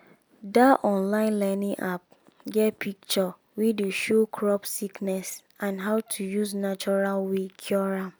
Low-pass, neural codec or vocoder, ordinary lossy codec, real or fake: none; none; none; real